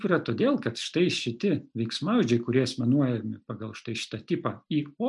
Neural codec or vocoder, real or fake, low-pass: none; real; 10.8 kHz